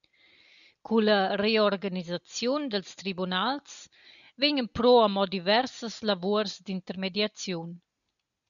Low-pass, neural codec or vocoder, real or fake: 7.2 kHz; none; real